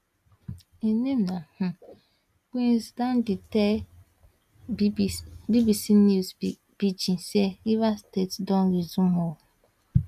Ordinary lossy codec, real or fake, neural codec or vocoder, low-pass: AAC, 96 kbps; real; none; 14.4 kHz